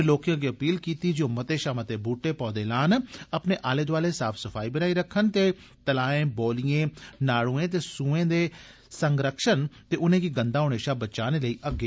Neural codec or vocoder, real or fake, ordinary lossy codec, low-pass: none; real; none; none